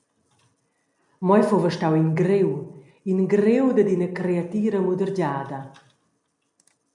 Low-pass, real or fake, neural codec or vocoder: 10.8 kHz; real; none